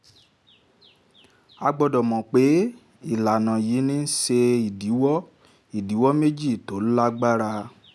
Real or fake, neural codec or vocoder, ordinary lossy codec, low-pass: real; none; none; none